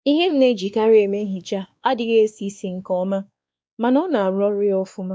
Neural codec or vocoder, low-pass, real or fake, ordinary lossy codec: codec, 16 kHz, 2 kbps, X-Codec, WavLM features, trained on Multilingual LibriSpeech; none; fake; none